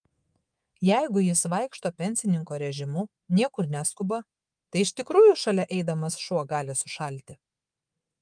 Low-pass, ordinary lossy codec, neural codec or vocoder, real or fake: 9.9 kHz; Opus, 32 kbps; codec, 24 kHz, 3.1 kbps, DualCodec; fake